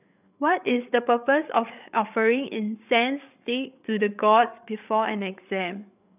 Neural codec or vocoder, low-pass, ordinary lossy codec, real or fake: codec, 16 kHz, 8 kbps, FreqCodec, larger model; 3.6 kHz; none; fake